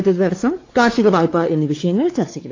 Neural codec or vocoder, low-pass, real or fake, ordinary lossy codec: codec, 16 kHz, 4 kbps, X-Codec, WavLM features, trained on Multilingual LibriSpeech; 7.2 kHz; fake; AAC, 32 kbps